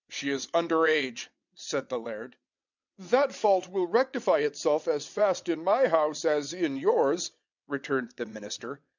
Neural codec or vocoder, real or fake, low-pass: vocoder, 22.05 kHz, 80 mel bands, WaveNeXt; fake; 7.2 kHz